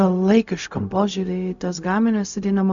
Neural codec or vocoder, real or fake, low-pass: codec, 16 kHz, 0.4 kbps, LongCat-Audio-Codec; fake; 7.2 kHz